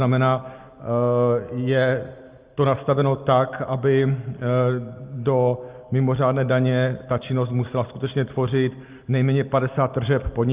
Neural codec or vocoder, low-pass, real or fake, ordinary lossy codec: none; 3.6 kHz; real; Opus, 24 kbps